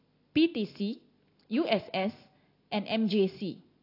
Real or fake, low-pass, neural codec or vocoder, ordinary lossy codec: real; 5.4 kHz; none; AAC, 32 kbps